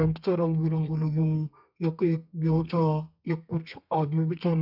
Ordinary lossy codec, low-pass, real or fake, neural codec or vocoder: none; 5.4 kHz; fake; codec, 32 kHz, 1.9 kbps, SNAC